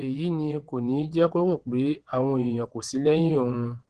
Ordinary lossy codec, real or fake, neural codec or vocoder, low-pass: Opus, 16 kbps; fake; vocoder, 22.05 kHz, 80 mel bands, WaveNeXt; 9.9 kHz